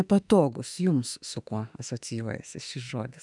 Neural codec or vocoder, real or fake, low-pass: autoencoder, 48 kHz, 32 numbers a frame, DAC-VAE, trained on Japanese speech; fake; 10.8 kHz